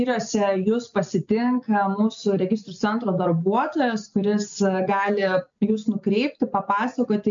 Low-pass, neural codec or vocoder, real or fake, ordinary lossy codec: 7.2 kHz; none; real; MP3, 64 kbps